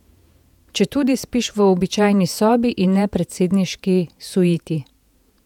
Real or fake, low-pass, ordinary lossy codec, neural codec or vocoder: fake; 19.8 kHz; none; vocoder, 48 kHz, 128 mel bands, Vocos